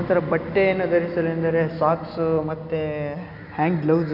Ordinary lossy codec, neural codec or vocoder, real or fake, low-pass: none; none; real; 5.4 kHz